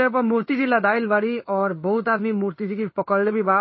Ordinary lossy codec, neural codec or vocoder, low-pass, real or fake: MP3, 24 kbps; codec, 16 kHz in and 24 kHz out, 1 kbps, XY-Tokenizer; 7.2 kHz; fake